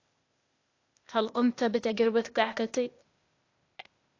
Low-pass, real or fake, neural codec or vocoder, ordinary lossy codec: 7.2 kHz; fake; codec, 16 kHz, 0.8 kbps, ZipCodec; AAC, 48 kbps